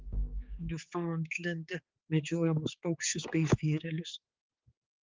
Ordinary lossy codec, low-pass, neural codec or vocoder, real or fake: Opus, 32 kbps; 7.2 kHz; codec, 16 kHz, 4 kbps, X-Codec, HuBERT features, trained on general audio; fake